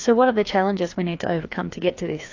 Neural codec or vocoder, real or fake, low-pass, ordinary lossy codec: codec, 16 kHz, 2 kbps, FreqCodec, larger model; fake; 7.2 kHz; AAC, 48 kbps